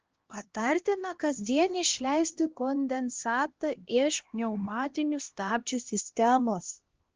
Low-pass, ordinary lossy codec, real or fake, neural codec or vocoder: 7.2 kHz; Opus, 16 kbps; fake; codec, 16 kHz, 1 kbps, X-Codec, HuBERT features, trained on LibriSpeech